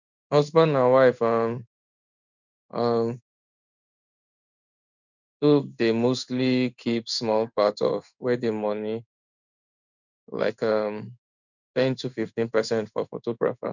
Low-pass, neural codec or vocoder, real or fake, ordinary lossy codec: 7.2 kHz; codec, 16 kHz in and 24 kHz out, 1 kbps, XY-Tokenizer; fake; none